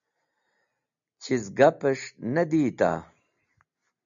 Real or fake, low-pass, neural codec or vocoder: real; 7.2 kHz; none